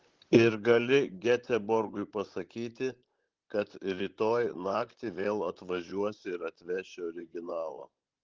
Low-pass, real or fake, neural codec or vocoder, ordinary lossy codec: 7.2 kHz; fake; vocoder, 44.1 kHz, 128 mel bands, Pupu-Vocoder; Opus, 16 kbps